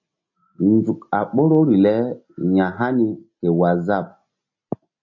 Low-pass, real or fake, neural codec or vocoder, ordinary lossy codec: 7.2 kHz; real; none; AAC, 48 kbps